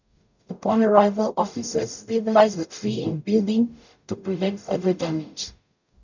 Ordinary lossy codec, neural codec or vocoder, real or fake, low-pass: none; codec, 44.1 kHz, 0.9 kbps, DAC; fake; 7.2 kHz